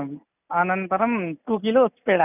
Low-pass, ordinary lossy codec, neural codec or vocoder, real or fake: 3.6 kHz; none; none; real